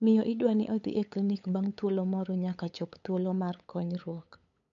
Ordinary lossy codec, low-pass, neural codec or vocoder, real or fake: none; 7.2 kHz; codec, 16 kHz, 8 kbps, FunCodec, trained on LibriTTS, 25 frames a second; fake